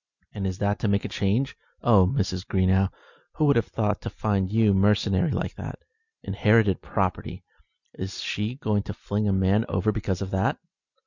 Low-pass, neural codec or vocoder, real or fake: 7.2 kHz; none; real